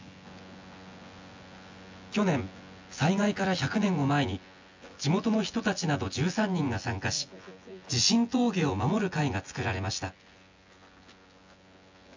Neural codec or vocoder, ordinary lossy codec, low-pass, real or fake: vocoder, 24 kHz, 100 mel bands, Vocos; MP3, 64 kbps; 7.2 kHz; fake